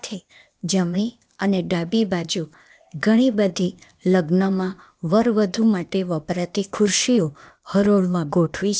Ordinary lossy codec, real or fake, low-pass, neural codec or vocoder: none; fake; none; codec, 16 kHz, 0.8 kbps, ZipCodec